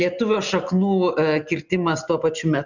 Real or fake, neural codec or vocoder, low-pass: real; none; 7.2 kHz